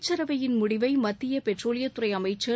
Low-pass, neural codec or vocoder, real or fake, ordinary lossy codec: none; none; real; none